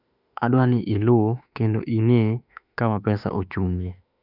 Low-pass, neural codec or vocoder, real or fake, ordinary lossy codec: 5.4 kHz; autoencoder, 48 kHz, 32 numbers a frame, DAC-VAE, trained on Japanese speech; fake; none